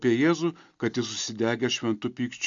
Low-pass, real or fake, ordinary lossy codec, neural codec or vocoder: 7.2 kHz; real; MP3, 64 kbps; none